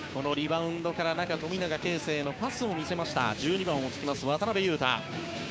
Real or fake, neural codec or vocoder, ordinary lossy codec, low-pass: fake; codec, 16 kHz, 6 kbps, DAC; none; none